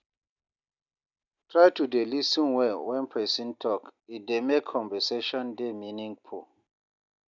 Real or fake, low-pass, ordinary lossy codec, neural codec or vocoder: real; 7.2 kHz; none; none